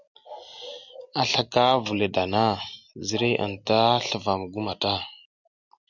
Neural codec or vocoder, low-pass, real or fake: none; 7.2 kHz; real